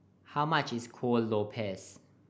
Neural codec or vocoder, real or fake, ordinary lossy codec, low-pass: none; real; none; none